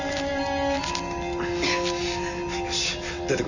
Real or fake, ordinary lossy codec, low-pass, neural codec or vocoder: real; none; 7.2 kHz; none